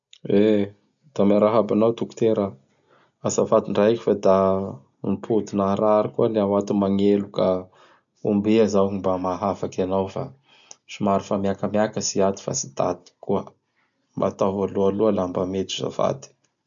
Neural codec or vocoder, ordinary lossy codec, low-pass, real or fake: none; none; 7.2 kHz; real